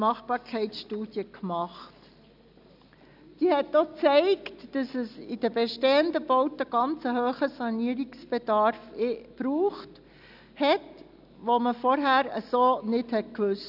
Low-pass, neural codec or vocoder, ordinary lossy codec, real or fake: 5.4 kHz; none; none; real